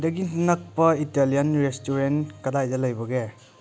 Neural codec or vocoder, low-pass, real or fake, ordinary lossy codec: none; none; real; none